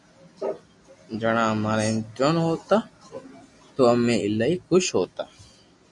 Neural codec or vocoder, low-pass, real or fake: none; 10.8 kHz; real